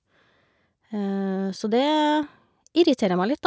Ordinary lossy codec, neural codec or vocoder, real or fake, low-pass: none; none; real; none